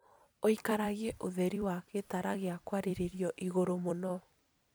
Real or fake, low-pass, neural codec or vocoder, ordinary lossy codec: fake; none; vocoder, 44.1 kHz, 128 mel bands every 256 samples, BigVGAN v2; none